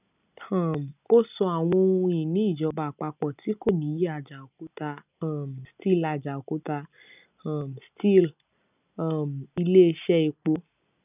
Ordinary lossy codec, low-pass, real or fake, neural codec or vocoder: none; 3.6 kHz; real; none